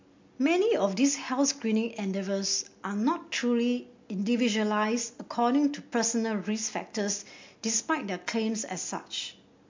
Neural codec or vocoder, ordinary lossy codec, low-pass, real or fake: none; MP3, 48 kbps; 7.2 kHz; real